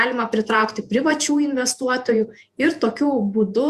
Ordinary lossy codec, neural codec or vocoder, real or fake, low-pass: Opus, 64 kbps; vocoder, 48 kHz, 128 mel bands, Vocos; fake; 14.4 kHz